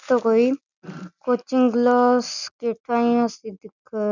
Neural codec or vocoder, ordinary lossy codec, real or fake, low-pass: none; none; real; 7.2 kHz